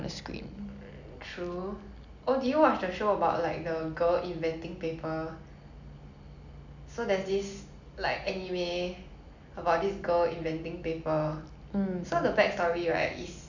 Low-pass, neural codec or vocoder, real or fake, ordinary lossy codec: 7.2 kHz; none; real; none